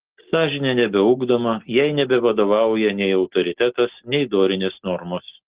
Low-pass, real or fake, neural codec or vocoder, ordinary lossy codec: 3.6 kHz; real; none; Opus, 16 kbps